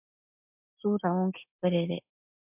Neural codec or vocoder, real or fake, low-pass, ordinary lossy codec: none; real; 3.6 kHz; MP3, 32 kbps